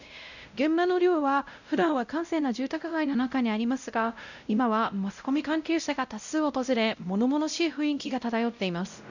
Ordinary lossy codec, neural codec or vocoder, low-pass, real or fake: none; codec, 16 kHz, 0.5 kbps, X-Codec, WavLM features, trained on Multilingual LibriSpeech; 7.2 kHz; fake